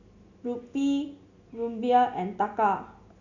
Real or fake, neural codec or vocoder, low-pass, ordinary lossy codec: real; none; 7.2 kHz; none